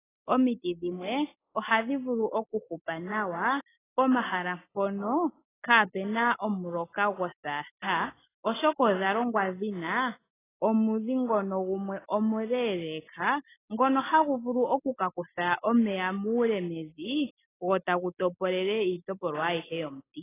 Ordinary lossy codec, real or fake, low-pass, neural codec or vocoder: AAC, 16 kbps; real; 3.6 kHz; none